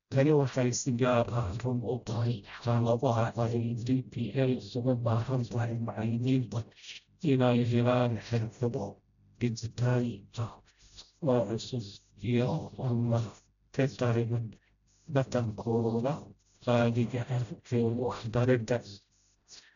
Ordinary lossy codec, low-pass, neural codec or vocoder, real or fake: none; 7.2 kHz; codec, 16 kHz, 0.5 kbps, FreqCodec, smaller model; fake